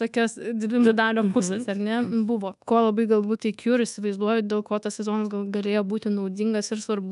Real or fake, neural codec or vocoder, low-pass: fake; codec, 24 kHz, 1.2 kbps, DualCodec; 10.8 kHz